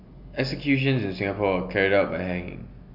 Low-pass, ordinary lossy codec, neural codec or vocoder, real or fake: 5.4 kHz; none; none; real